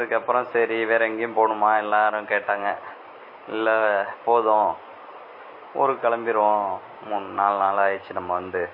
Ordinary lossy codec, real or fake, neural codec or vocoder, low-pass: MP3, 24 kbps; real; none; 5.4 kHz